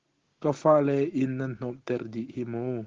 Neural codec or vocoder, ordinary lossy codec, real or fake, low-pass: none; Opus, 16 kbps; real; 7.2 kHz